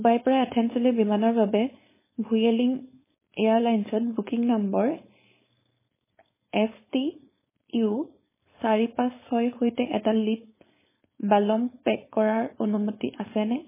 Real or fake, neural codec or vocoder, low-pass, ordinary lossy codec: fake; codec, 16 kHz, 4.8 kbps, FACodec; 3.6 kHz; MP3, 16 kbps